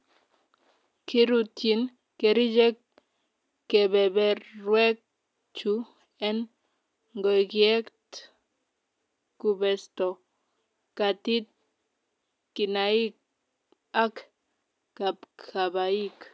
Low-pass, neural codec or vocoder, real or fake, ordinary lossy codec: none; none; real; none